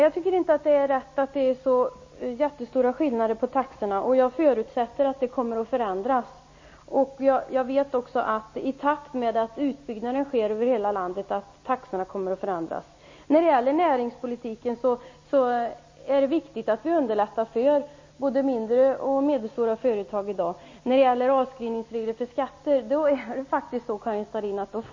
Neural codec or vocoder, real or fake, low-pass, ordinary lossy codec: none; real; 7.2 kHz; MP3, 32 kbps